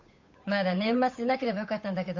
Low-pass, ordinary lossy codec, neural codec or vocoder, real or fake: 7.2 kHz; MP3, 48 kbps; codec, 16 kHz, 8 kbps, FunCodec, trained on Chinese and English, 25 frames a second; fake